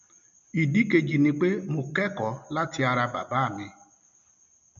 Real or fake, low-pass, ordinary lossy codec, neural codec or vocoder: real; 7.2 kHz; none; none